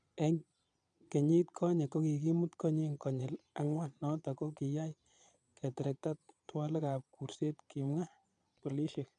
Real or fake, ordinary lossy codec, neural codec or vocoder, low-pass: fake; none; vocoder, 22.05 kHz, 80 mel bands, Vocos; 9.9 kHz